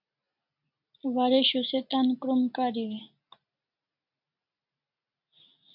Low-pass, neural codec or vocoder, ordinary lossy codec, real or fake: 5.4 kHz; none; MP3, 48 kbps; real